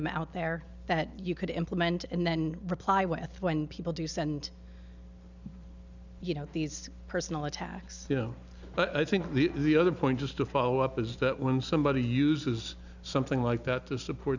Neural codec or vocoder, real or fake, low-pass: none; real; 7.2 kHz